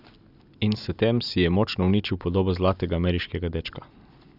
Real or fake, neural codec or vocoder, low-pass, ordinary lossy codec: real; none; 5.4 kHz; none